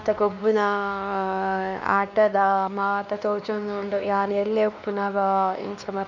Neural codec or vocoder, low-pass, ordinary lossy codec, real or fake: codec, 16 kHz, 2 kbps, X-Codec, WavLM features, trained on Multilingual LibriSpeech; 7.2 kHz; none; fake